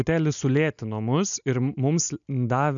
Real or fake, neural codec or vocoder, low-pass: real; none; 7.2 kHz